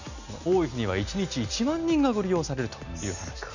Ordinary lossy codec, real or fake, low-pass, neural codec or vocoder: none; real; 7.2 kHz; none